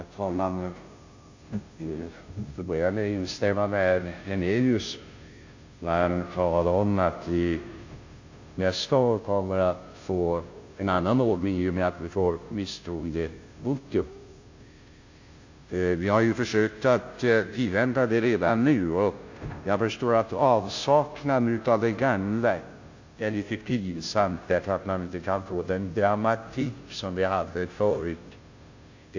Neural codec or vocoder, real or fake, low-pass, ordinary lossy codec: codec, 16 kHz, 0.5 kbps, FunCodec, trained on Chinese and English, 25 frames a second; fake; 7.2 kHz; AAC, 48 kbps